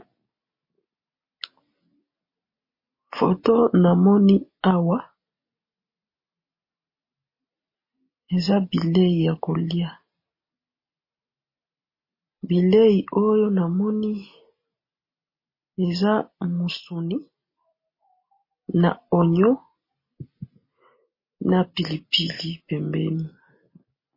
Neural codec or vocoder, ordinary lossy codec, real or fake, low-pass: none; MP3, 24 kbps; real; 5.4 kHz